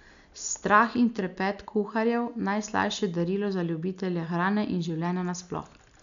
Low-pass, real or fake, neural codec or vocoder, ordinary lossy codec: 7.2 kHz; real; none; none